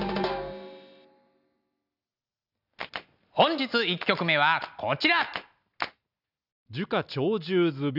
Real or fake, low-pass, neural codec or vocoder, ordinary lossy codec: real; 5.4 kHz; none; none